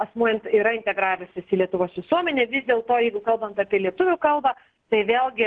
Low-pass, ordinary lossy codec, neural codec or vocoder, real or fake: 9.9 kHz; Opus, 16 kbps; none; real